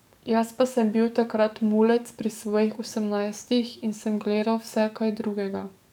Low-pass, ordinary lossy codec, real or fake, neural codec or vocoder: 19.8 kHz; none; fake; codec, 44.1 kHz, 7.8 kbps, DAC